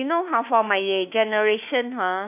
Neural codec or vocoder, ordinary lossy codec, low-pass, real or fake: autoencoder, 48 kHz, 32 numbers a frame, DAC-VAE, trained on Japanese speech; none; 3.6 kHz; fake